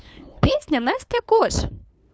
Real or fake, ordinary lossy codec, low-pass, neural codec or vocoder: fake; none; none; codec, 16 kHz, 2 kbps, FunCodec, trained on LibriTTS, 25 frames a second